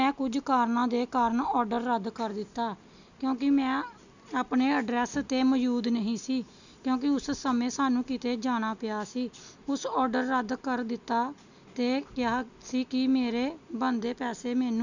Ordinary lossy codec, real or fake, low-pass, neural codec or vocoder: none; real; 7.2 kHz; none